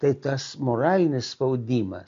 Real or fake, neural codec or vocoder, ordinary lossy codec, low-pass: real; none; MP3, 48 kbps; 7.2 kHz